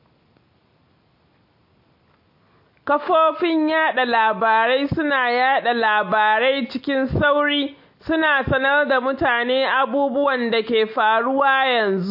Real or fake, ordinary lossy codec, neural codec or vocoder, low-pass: real; MP3, 32 kbps; none; 5.4 kHz